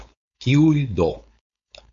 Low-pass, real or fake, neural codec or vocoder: 7.2 kHz; fake; codec, 16 kHz, 4.8 kbps, FACodec